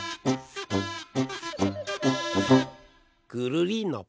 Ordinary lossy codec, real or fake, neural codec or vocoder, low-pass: none; real; none; none